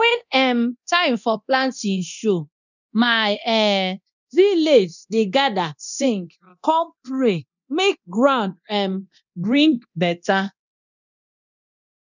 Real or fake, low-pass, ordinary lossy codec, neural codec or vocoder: fake; 7.2 kHz; none; codec, 24 kHz, 0.9 kbps, DualCodec